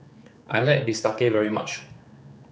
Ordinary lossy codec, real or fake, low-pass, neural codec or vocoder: none; fake; none; codec, 16 kHz, 4 kbps, X-Codec, HuBERT features, trained on general audio